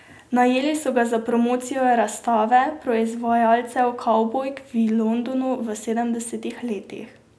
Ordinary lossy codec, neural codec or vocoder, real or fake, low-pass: none; none; real; none